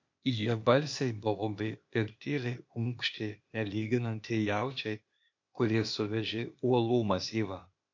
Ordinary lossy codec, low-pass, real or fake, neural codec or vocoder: MP3, 48 kbps; 7.2 kHz; fake; codec, 16 kHz, 0.8 kbps, ZipCodec